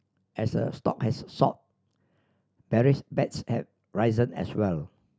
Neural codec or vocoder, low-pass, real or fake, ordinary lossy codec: none; none; real; none